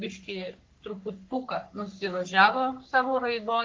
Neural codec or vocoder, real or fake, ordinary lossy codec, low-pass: codec, 44.1 kHz, 3.4 kbps, Pupu-Codec; fake; Opus, 32 kbps; 7.2 kHz